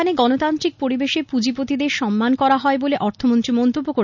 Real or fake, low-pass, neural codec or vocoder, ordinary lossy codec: real; 7.2 kHz; none; none